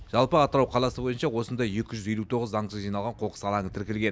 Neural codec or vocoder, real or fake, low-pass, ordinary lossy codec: none; real; none; none